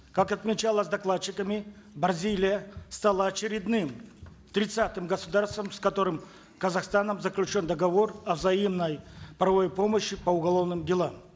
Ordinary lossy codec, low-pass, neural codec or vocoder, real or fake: none; none; none; real